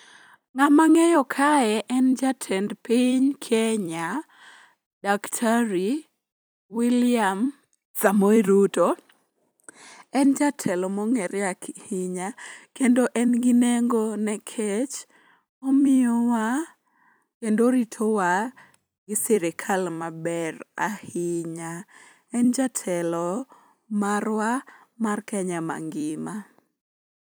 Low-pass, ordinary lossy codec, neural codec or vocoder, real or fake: none; none; vocoder, 44.1 kHz, 128 mel bands every 256 samples, BigVGAN v2; fake